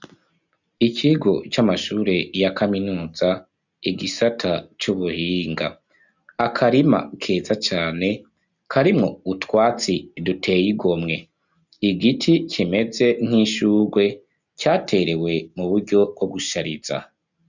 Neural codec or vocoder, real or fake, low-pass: none; real; 7.2 kHz